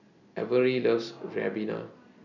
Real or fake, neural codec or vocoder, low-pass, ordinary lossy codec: real; none; 7.2 kHz; none